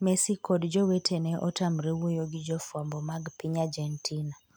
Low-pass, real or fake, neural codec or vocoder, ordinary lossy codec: none; real; none; none